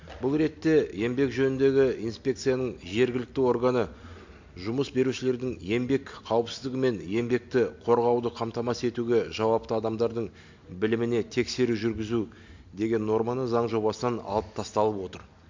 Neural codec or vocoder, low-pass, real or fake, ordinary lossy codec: none; 7.2 kHz; real; MP3, 48 kbps